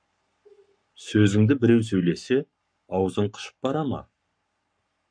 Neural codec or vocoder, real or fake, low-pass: codec, 44.1 kHz, 7.8 kbps, Pupu-Codec; fake; 9.9 kHz